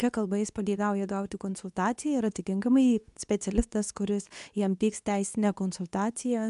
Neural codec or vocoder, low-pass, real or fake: codec, 24 kHz, 0.9 kbps, WavTokenizer, medium speech release version 2; 10.8 kHz; fake